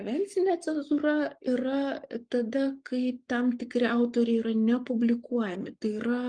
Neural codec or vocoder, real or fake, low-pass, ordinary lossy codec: codec, 44.1 kHz, 7.8 kbps, Pupu-Codec; fake; 9.9 kHz; Opus, 32 kbps